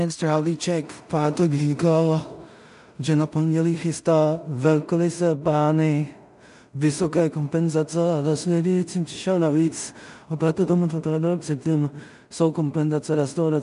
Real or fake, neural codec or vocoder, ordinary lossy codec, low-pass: fake; codec, 16 kHz in and 24 kHz out, 0.4 kbps, LongCat-Audio-Codec, two codebook decoder; MP3, 96 kbps; 10.8 kHz